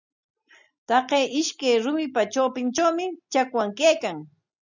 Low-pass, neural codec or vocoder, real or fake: 7.2 kHz; none; real